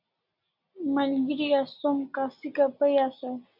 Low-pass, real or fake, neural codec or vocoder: 5.4 kHz; real; none